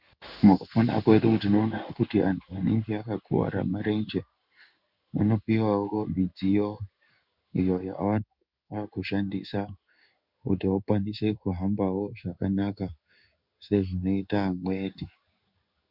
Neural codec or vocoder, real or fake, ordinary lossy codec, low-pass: codec, 16 kHz in and 24 kHz out, 1 kbps, XY-Tokenizer; fake; Opus, 64 kbps; 5.4 kHz